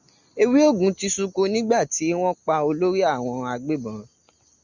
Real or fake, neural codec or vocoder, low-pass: real; none; 7.2 kHz